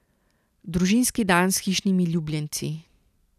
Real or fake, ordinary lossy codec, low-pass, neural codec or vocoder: fake; none; 14.4 kHz; vocoder, 44.1 kHz, 128 mel bands every 256 samples, BigVGAN v2